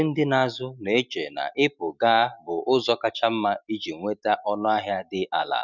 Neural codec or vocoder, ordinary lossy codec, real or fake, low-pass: none; none; real; 7.2 kHz